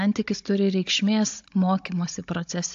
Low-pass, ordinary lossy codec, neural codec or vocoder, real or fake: 7.2 kHz; AAC, 48 kbps; codec, 16 kHz, 16 kbps, FunCodec, trained on Chinese and English, 50 frames a second; fake